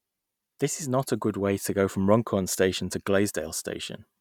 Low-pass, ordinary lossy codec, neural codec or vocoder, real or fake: 19.8 kHz; none; none; real